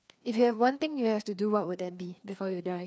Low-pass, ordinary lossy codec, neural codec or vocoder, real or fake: none; none; codec, 16 kHz, 2 kbps, FreqCodec, larger model; fake